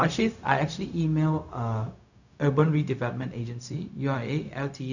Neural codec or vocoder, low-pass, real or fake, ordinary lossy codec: codec, 16 kHz, 0.4 kbps, LongCat-Audio-Codec; 7.2 kHz; fake; none